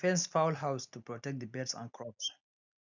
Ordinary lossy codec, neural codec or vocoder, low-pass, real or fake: none; none; 7.2 kHz; real